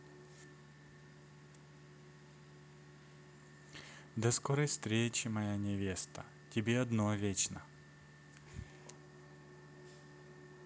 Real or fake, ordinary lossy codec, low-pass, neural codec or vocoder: real; none; none; none